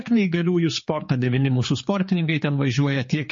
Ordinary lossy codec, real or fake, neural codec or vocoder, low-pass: MP3, 32 kbps; fake; codec, 16 kHz, 4 kbps, X-Codec, HuBERT features, trained on general audio; 7.2 kHz